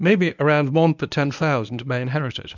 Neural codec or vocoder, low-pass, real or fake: codec, 24 kHz, 0.9 kbps, WavTokenizer, medium speech release version 1; 7.2 kHz; fake